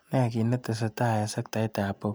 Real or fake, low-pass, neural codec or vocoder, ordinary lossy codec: real; none; none; none